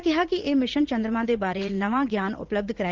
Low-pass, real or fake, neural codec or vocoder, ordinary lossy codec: 7.2 kHz; fake; vocoder, 44.1 kHz, 80 mel bands, Vocos; Opus, 16 kbps